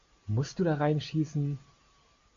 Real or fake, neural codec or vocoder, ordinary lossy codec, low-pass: real; none; AAC, 64 kbps; 7.2 kHz